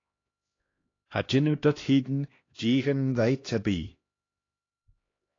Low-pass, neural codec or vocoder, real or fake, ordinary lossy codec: 7.2 kHz; codec, 16 kHz, 1 kbps, X-Codec, HuBERT features, trained on LibriSpeech; fake; AAC, 32 kbps